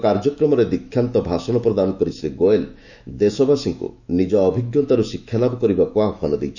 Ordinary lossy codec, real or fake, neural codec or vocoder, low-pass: none; fake; autoencoder, 48 kHz, 128 numbers a frame, DAC-VAE, trained on Japanese speech; 7.2 kHz